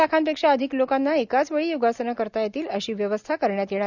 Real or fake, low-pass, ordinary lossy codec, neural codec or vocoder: real; 7.2 kHz; none; none